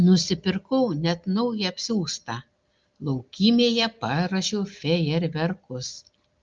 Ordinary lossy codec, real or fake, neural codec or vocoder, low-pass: Opus, 32 kbps; real; none; 7.2 kHz